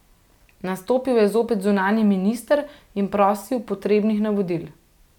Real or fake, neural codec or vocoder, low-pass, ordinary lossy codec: real; none; 19.8 kHz; none